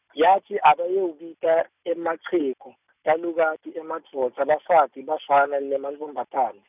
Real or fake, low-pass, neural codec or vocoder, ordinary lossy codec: real; 3.6 kHz; none; none